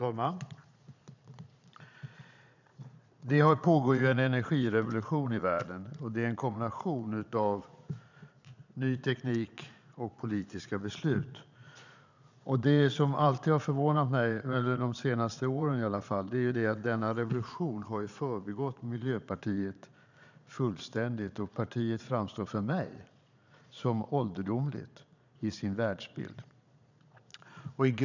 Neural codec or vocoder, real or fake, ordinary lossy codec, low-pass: vocoder, 22.05 kHz, 80 mel bands, Vocos; fake; none; 7.2 kHz